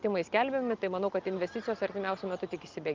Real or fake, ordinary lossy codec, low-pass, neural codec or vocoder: real; Opus, 24 kbps; 7.2 kHz; none